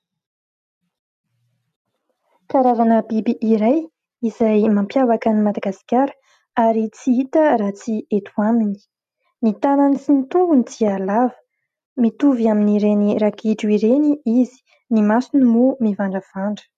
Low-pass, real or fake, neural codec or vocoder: 14.4 kHz; real; none